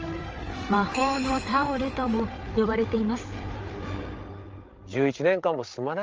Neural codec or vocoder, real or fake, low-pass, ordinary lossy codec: codec, 16 kHz, 8 kbps, FreqCodec, larger model; fake; 7.2 kHz; Opus, 24 kbps